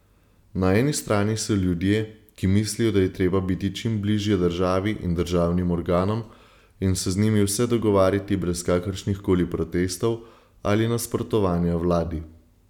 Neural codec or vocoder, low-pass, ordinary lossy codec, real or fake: none; 19.8 kHz; none; real